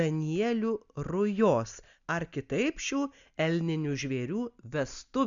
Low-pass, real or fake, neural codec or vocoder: 7.2 kHz; real; none